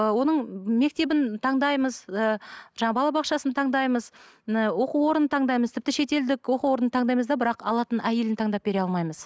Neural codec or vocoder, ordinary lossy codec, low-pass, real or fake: none; none; none; real